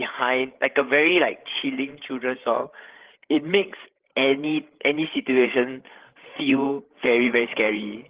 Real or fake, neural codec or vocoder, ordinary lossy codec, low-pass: fake; codec, 16 kHz, 8 kbps, FreqCodec, larger model; Opus, 16 kbps; 3.6 kHz